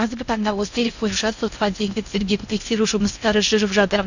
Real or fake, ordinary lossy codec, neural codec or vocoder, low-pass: fake; none; codec, 16 kHz in and 24 kHz out, 0.6 kbps, FocalCodec, streaming, 2048 codes; 7.2 kHz